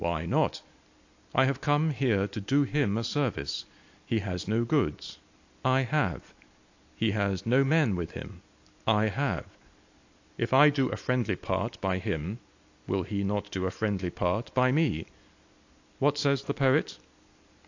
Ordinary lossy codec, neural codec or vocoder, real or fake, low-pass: AAC, 48 kbps; none; real; 7.2 kHz